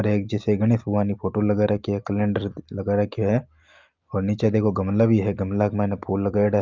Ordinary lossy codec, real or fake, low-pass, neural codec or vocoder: Opus, 32 kbps; real; 7.2 kHz; none